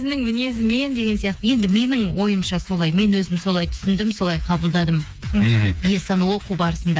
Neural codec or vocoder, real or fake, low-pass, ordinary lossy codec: codec, 16 kHz, 4 kbps, FreqCodec, smaller model; fake; none; none